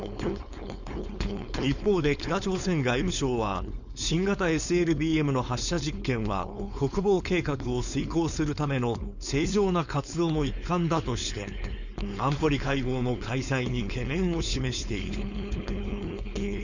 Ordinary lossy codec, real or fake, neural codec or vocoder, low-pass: none; fake; codec, 16 kHz, 4.8 kbps, FACodec; 7.2 kHz